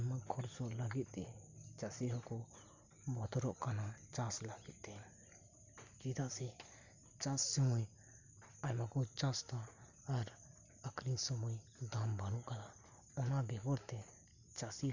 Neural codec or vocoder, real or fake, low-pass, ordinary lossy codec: none; real; 7.2 kHz; Opus, 64 kbps